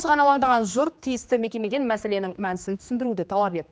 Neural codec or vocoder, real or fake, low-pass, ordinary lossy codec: codec, 16 kHz, 2 kbps, X-Codec, HuBERT features, trained on general audio; fake; none; none